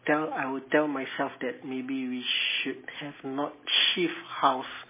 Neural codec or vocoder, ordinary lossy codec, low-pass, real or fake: none; MP3, 16 kbps; 3.6 kHz; real